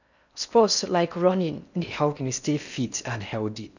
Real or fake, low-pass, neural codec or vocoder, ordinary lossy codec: fake; 7.2 kHz; codec, 16 kHz in and 24 kHz out, 0.6 kbps, FocalCodec, streaming, 4096 codes; none